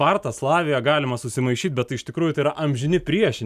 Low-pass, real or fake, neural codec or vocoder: 14.4 kHz; real; none